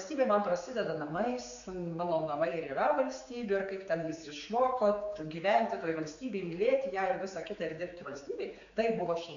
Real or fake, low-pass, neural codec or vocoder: fake; 7.2 kHz; codec, 16 kHz, 4 kbps, X-Codec, HuBERT features, trained on general audio